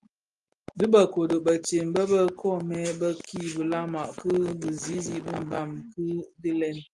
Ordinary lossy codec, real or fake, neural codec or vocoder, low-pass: Opus, 32 kbps; real; none; 10.8 kHz